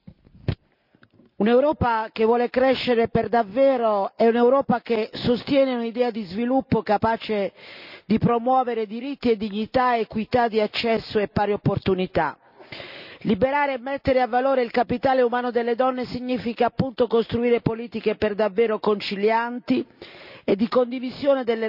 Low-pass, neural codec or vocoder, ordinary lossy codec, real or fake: 5.4 kHz; none; none; real